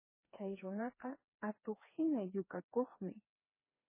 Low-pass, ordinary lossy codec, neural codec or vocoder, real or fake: 3.6 kHz; MP3, 16 kbps; codec, 16 kHz, 4 kbps, FreqCodec, smaller model; fake